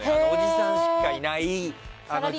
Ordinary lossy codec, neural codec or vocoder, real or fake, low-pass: none; none; real; none